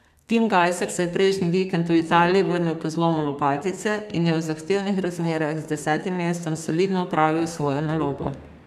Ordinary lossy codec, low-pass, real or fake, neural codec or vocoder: none; 14.4 kHz; fake; codec, 32 kHz, 1.9 kbps, SNAC